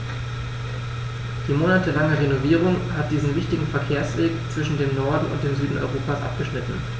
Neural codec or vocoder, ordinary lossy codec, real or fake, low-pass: none; none; real; none